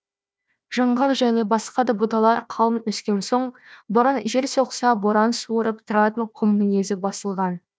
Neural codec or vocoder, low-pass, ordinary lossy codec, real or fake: codec, 16 kHz, 1 kbps, FunCodec, trained on Chinese and English, 50 frames a second; none; none; fake